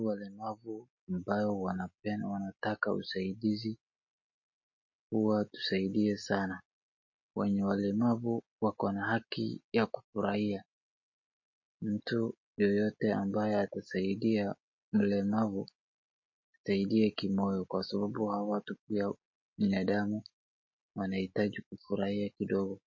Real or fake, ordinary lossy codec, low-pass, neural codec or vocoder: real; MP3, 32 kbps; 7.2 kHz; none